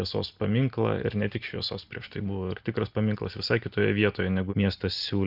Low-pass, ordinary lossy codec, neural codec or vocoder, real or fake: 5.4 kHz; Opus, 32 kbps; none; real